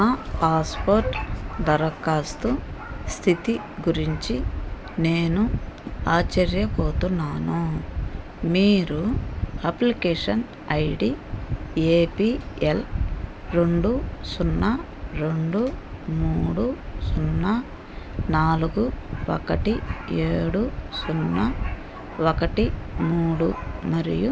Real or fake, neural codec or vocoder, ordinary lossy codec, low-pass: real; none; none; none